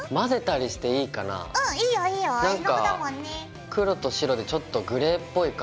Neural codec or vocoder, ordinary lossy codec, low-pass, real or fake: none; none; none; real